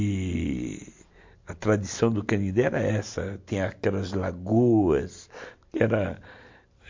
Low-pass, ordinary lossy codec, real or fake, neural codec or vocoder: 7.2 kHz; MP3, 48 kbps; real; none